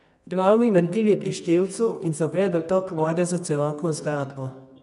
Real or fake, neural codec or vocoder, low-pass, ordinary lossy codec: fake; codec, 24 kHz, 0.9 kbps, WavTokenizer, medium music audio release; 10.8 kHz; none